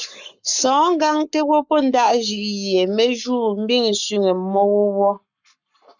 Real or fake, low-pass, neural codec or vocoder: fake; 7.2 kHz; codec, 44.1 kHz, 7.8 kbps, Pupu-Codec